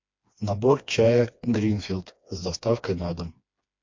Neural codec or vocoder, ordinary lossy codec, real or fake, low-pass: codec, 16 kHz, 2 kbps, FreqCodec, smaller model; MP3, 48 kbps; fake; 7.2 kHz